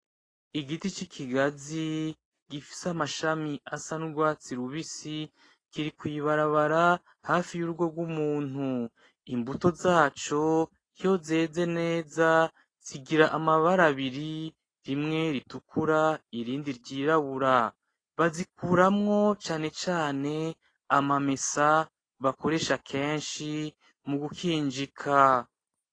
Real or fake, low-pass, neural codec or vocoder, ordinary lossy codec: real; 9.9 kHz; none; AAC, 32 kbps